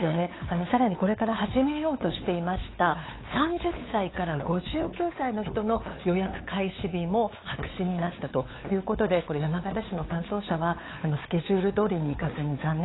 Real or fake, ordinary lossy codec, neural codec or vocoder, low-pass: fake; AAC, 16 kbps; codec, 16 kHz, 8 kbps, FunCodec, trained on LibriTTS, 25 frames a second; 7.2 kHz